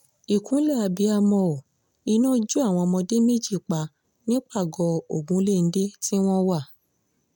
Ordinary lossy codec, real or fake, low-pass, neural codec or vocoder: none; real; 19.8 kHz; none